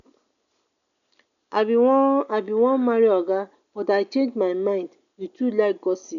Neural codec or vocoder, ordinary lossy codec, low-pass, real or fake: none; none; 7.2 kHz; real